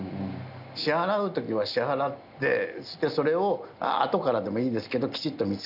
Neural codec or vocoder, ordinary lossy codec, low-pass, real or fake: none; none; 5.4 kHz; real